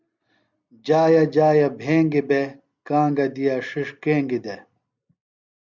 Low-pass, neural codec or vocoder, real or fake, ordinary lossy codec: 7.2 kHz; none; real; Opus, 64 kbps